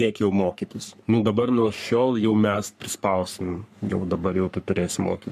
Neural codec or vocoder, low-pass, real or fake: codec, 44.1 kHz, 3.4 kbps, Pupu-Codec; 14.4 kHz; fake